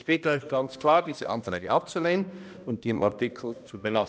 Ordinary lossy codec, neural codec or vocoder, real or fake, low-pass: none; codec, 16 kHz, 1 kbps, X-Codec, HuBERT features, trained on balanced general audio; fake; none